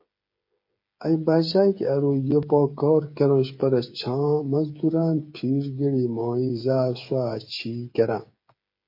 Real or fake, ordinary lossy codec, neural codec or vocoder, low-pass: fake; MP3, 32 kbps; codec, 16 kHz, 8 kbps, FreqCodec, smaller model; 5.4 kHz